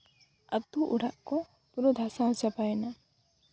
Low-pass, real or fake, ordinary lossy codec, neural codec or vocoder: none; real; none; none